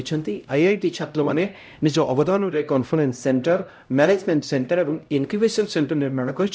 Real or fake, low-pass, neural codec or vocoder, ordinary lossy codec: fake; none; codec, 16 kHz, 0.5 kbps, X-Codec, HuBERT features, trained on LibriSpeech; none